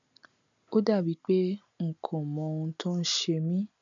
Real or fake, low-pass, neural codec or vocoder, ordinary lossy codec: real; 7.2 kHz; none; none